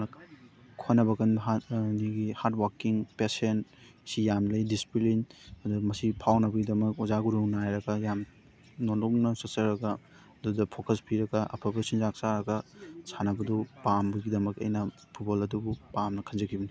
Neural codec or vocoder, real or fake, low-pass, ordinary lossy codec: none; real; none; none